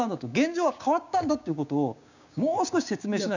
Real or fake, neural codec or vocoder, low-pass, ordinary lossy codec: fake; codec, 16 kHz, 6 kbps, DAC; 7.2 kHz; none